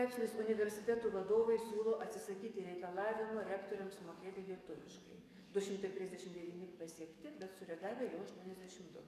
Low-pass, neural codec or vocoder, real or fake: 14.4 kHz; codec, 44.1 kHz, 7.8 kbps, DAC; fake